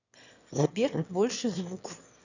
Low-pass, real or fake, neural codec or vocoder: 7.2 kHz; fake; autoencoder, 22.05 kHz, a latent of 192 numbers a frame, VITS, trained on one speaker